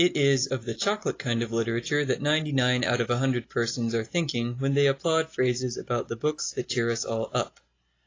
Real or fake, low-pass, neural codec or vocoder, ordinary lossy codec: real; 7.2 kHz; none; AAC, 32 kbps